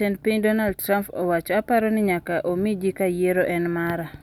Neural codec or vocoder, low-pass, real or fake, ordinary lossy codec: none; 19.8 kHz; real; none